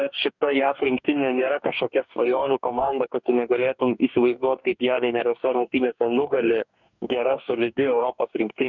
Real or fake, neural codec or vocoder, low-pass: fake; codec, 44.1 kHz, 2.6 kbps, DAC; 7.2 kHz